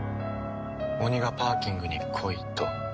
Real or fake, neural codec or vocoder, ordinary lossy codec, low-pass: real; none; none; none